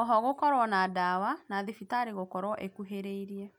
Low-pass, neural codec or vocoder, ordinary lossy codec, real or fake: 19.8 kHz; none; none; real